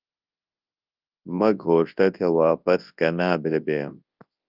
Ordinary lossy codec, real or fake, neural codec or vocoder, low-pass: Opus, 24 kbps; fake; codec, 24 kHz, 0.9 kbps, WavTokenizer, large speech release; 5.4 kHz